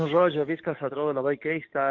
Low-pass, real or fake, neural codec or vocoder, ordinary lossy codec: 7.2 kHz; fake; codec, 44.1 kHz, 7.8 kbps, DAC; Opus, 24 kbps